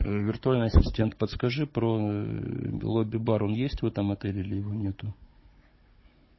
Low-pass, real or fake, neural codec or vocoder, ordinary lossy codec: 7.2 kHz; fake; codec, 16 kHz, 16 kbps, FunCodec, trained on Chinese and English, 50 frames a second; MP3, 24 kbps